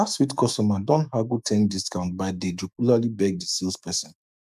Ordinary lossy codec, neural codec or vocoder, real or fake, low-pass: none; autoencoder, 48 kHz, 128 numbers a frame, DAC-VAE, trained on Japanese speech; fake; 14.4 kHz